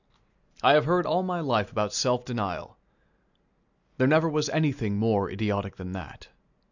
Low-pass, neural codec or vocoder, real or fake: 7.2 kHz; none; real